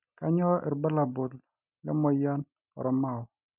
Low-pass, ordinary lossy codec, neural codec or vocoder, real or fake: 3.6 kHz; none; none; real